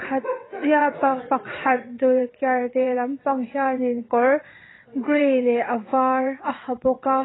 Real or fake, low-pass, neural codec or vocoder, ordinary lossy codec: fake; 7.2 kHz; vocoder, 22.05 kHz, 80 mel bands, WaveNeXt; AAC, 16 kbps